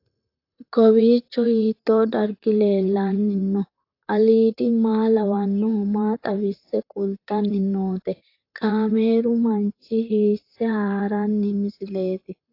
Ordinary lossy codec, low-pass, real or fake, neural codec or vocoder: AAC, 32 kbps; 5.4 kHz; fake; vocoder, 44.1 kHz, 128 mel bands, Pupu-Vocoder